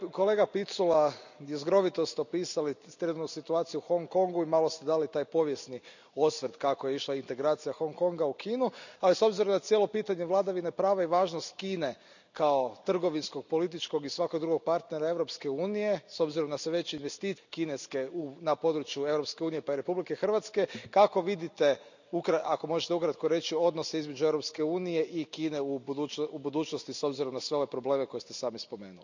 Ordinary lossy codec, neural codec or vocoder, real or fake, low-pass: none; none; real; 7.2 kHz